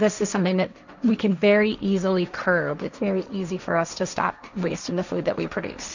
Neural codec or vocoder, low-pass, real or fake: codec, 16 kHz, 1.1 kbps, Voila-Tokenizer; 7.2 kHz; fake